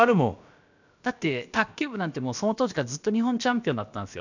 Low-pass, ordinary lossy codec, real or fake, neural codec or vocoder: 7.2 kHz; none; fake; codec, 16 kHz, about 1 kbps, DyCAST, with the encoder's durations